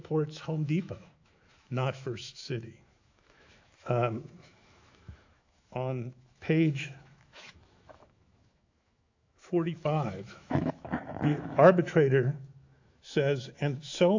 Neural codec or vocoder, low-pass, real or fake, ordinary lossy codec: autoencoder, 48 kHz, 128 numbers a frame, DAC-VAE, trained on Japanese speech; 7.2 kHz; fake; AAC, 48 kbps